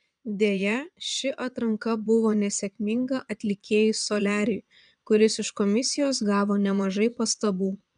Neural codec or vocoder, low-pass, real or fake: vocoder, 22.05 kHz, 80 mel bands, Vocos; 9.9 kHz; fake